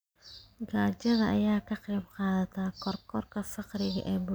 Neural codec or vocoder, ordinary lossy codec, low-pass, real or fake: none; none; none; real